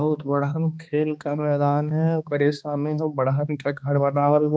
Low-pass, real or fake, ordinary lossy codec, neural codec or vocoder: none; fake; none; codec, 16 kHz, 2 kbps, X-Codec, HuBERT features, trained on balanced general audio